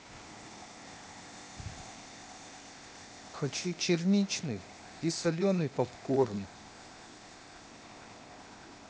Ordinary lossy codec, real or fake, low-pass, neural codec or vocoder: none; fake; none; codec, 16 kHz, 0.8 kbps, ZipCodec